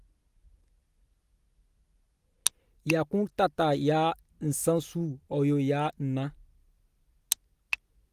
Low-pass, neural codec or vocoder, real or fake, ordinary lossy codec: 14.4 kHz; none; real; Opus, 24 kbps